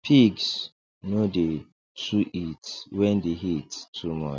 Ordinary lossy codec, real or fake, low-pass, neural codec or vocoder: none; real; none; none